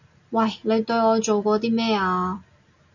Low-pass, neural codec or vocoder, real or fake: 7.2 kHz; none; real